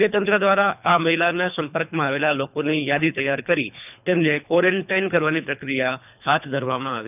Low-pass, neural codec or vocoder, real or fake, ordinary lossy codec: 3.6 kHz; codec, 24 kHz, 3 kbps, HILCodec; fake; none